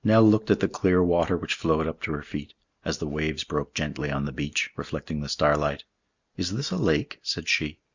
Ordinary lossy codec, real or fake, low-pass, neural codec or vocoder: Opus, 64 kbps; real; 7.2 kHz; none